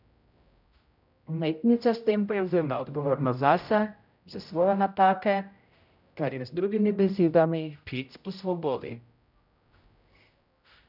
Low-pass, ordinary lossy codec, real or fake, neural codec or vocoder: 5.4 kHz; none; fake; codec, 16 kHz, 0.5 kbps, X-Codec, HuBERT features, trained on general audio